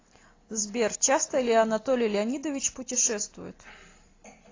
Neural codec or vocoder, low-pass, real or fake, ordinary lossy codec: none; 7.2 kHz; real; AAC, 32 kbps